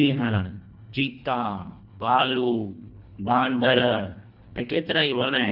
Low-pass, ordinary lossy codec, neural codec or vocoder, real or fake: 5.4 kHz; none; codec, 24 kHz, 1.5 kbps, HILCodec; fake